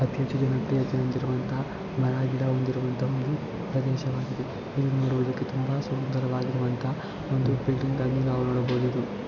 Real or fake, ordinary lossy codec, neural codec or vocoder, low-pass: real; none; none; 7.2 kHz